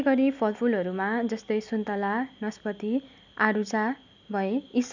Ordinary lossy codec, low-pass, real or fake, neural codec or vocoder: none; 7.2 kHz; real; none